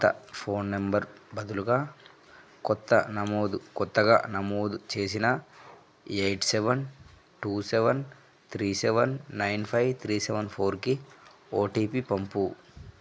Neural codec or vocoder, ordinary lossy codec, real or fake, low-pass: none; none; real; none